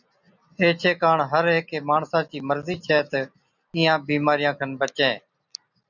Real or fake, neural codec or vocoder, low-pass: real; none; 7.2 kHz